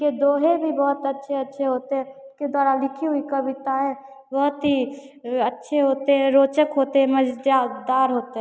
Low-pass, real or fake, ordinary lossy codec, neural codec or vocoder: none; real; none; none